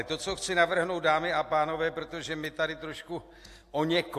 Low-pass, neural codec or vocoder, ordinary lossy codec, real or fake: 14.4 kHz; none; AAC, 64 kbps; real